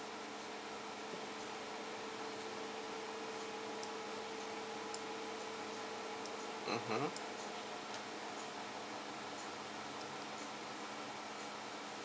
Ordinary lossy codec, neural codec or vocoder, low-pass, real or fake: none; none; none; real